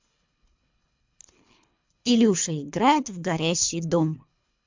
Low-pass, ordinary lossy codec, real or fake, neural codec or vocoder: 7.2 kHz; MP3, 64 kbps; fake; codec, 24 kHz, 3 kbps, HILCodec